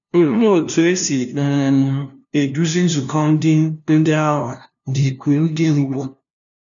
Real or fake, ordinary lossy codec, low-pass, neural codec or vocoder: fake; none; 7.2 kHz; codec, 16 kHz, 0.5 kbps, FunCodec, trained on LibriTTS, 25 frames a second